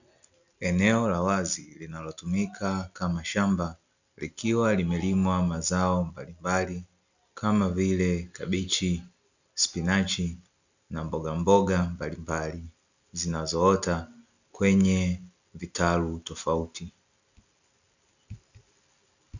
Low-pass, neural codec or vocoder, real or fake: 7.2 kHz; none; real